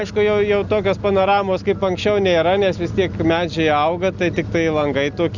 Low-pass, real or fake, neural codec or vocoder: 7.2 kHz; real; none